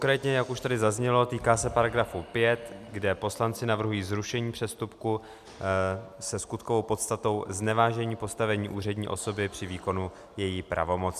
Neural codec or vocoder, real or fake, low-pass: none; real; 14.4 kHz